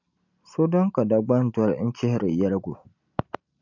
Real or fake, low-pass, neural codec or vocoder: real; 7.2 kHz; none